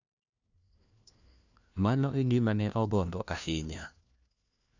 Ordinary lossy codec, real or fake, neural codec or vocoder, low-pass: none; fake; codec, 16 kHz, 1 kbps, FunCodec, trained on LibriTTS, 50 frames a second; 7.2 kHz